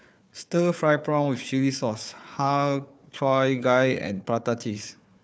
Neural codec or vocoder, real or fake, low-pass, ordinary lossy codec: codec, 16 kHz, 4 kbps, FunCodec, trained on Chinese and English, 50 frames a second; fake; none; none